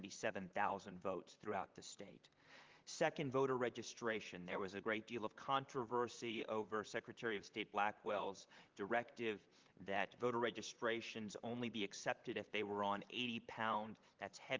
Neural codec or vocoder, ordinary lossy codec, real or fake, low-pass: vocoder, 44.1 kHz, 128 mel bands, Pupu-Vocoder; Opus, 32 kbps; fake; 7.2 kHz